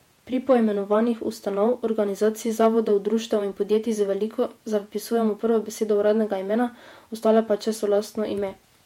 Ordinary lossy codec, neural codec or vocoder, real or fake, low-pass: MP3, 64 kbps; vocoder, 48 kHz, 128 mel bands, Vocos; fake; 19.8 kHz